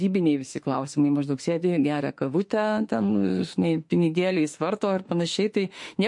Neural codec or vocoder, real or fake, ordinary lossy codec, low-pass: autoencoder, 48 kHz, 32 numbers a frame, DAC-VAE, trained on Japanese speech; fake; MP3, 48 kbps; 10.8 kHz